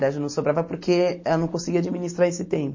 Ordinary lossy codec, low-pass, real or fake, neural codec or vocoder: MP3, 32 kbps; 7.2 kHz; real; none